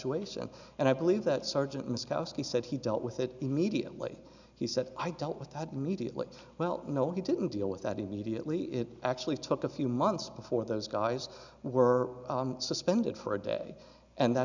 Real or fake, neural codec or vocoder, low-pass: real; none; 7.2 kHz